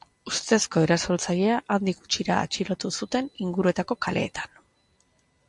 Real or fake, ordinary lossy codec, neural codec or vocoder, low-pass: real; MP3, 64 kbps; none; 10.8 kHz